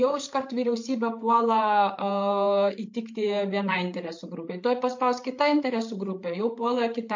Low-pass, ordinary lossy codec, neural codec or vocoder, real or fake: 7.2 kHz; MP3, 48 kbps; codec, 16 kHz, 8 kbps, FreqCodec, larger model; fake